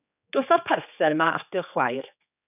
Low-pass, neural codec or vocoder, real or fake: 3.6 kHz; codec, 16 kHz, 4 kbps, X-Codec, HuBERT features, trained on general audio; fake